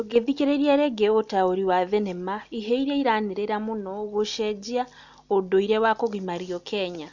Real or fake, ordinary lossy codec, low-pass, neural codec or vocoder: real; none; 7.2 kHz; none